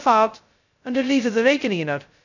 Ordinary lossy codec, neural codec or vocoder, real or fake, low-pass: none; codec, 16 kHz, 0.2 kbps, FocalCodec; fake; 7.2 kHz